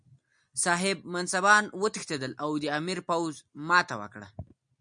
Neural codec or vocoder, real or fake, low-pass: none; real; 9.9 kHz